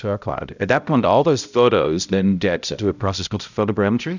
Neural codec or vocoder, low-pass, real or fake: codec, 16 kHz, 0.5 kbps, X-Codec, HuBERT features, trained on balanced general audio; 7.2 kHz; fake